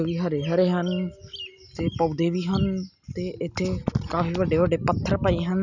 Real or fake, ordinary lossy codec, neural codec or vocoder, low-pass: real; none; none; 7.2 kHz